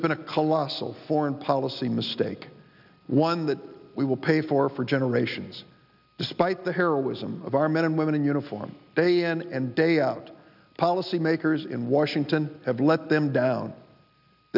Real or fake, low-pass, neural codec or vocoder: real; 5.4 kHz; none